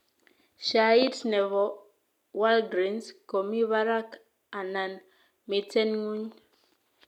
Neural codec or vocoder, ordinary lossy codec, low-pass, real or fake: none; none; 19.8 kHz; real